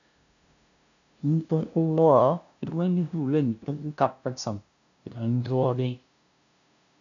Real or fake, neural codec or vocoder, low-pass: fake; codec, 16 kHz, 0.5 kbps, FunCodec, trained on LibriTTS, 25 frames a second; 7.2 kHz